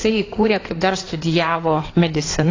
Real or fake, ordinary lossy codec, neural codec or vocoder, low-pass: fake; AAC, 32 kbps; codec, 16 kHz in and 24 kHz out, 2.2 kbps, FireRedTTS-2 codec; 7.2 kHz